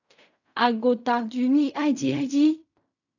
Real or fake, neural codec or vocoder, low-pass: fake; codec, 16 kHz in and 24 kHz out, 0.4 kbps, LongCat-Audio-Codec, fine tuned four codebook decoder; 7.2 kHz